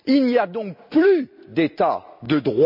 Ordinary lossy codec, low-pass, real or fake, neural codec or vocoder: MP3, 48 kbps; 5.4 kHz; real; none